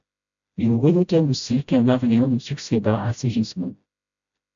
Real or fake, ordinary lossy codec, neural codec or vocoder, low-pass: fake; AAC, 64 kbps; codec, 16 kHz, 0.5 kbps, FreqCodec, smaller model; 7.2 kHz